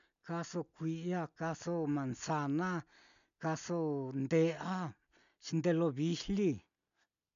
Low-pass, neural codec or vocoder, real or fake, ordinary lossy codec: 7.2 kHz; none; real; none